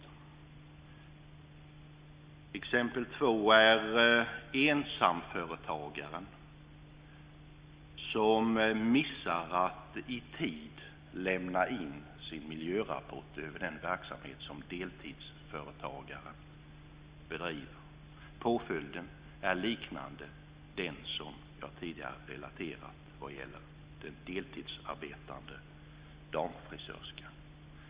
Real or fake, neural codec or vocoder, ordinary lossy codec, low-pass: real; none; Opus, 64 kbps; 3.6 kHz